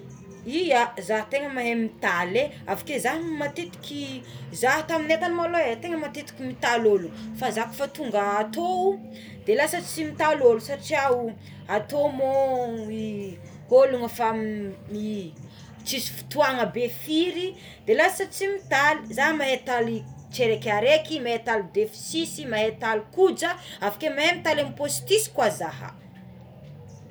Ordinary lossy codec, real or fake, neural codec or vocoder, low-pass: none; real; none; none